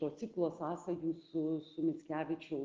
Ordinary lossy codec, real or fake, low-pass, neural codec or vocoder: Opus, 16 kbps; real; 7.2 kHz; none